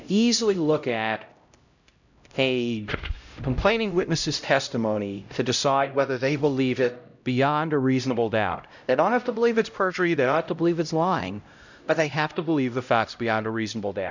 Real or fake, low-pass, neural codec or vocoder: fake; 7.2 kHz; codec, 16 kHz, 0.5 kbps, X-Codec, HuBERT features, trained on LibriSpeech